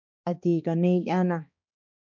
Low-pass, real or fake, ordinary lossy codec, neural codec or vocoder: 7.2 kHz; fake; MP3, 64 kbps; codec, 16 kHz, 2 kbps, X-Codec, HuBERT features, trained on balanced general audio